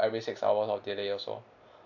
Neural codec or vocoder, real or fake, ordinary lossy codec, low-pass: none; real; AAC, 48 kbps; 7.2 kHz